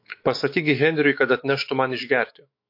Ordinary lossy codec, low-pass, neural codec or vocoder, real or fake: MP3, 32 kbps; 5.4 kHz; autoencoder, 48 kHz, 128 numbers a frame, DAC-VAE, trained on Japanese speech; fake